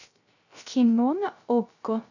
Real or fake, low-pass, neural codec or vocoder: fake; 7.2 kHz; codec, 16 kHz, 0.3 kbps, FocalCodec